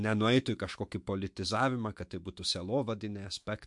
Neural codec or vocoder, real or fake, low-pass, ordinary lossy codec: autoencoder, 48 kHz, 128 numbers a frame, DAC-VAE, trained on Japanese speech; fake; 10.8 kHz; MP3, 64 kbps